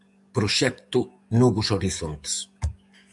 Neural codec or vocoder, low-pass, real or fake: codec, 44.1 kHz, 7.8 kbps, DAC; 10.8 kHz; fake